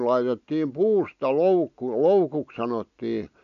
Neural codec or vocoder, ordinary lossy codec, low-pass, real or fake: none; none; 7.2 kHz; real